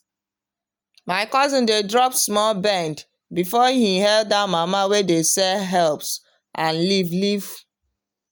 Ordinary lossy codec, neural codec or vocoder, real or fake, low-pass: none; none; real; none